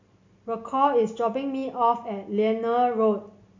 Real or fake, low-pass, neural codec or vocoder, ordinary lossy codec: real; 7.2 kHz; none; MP3, 64 kbps